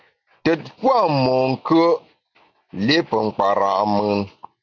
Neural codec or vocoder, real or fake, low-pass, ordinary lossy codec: none; real; 7.2 kHz; MP3, 64 kbps